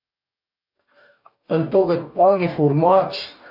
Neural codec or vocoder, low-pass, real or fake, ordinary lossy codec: codec, 44.1 kHz, 2.6 kbps, DAC; 5.4 kHz; fake; AAC, 48 kbps